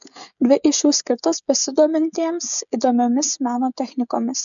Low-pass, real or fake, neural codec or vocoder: 7.2 kHz; fake; codec, 16 kHz, 16 kbps, FreqCodec, smaller model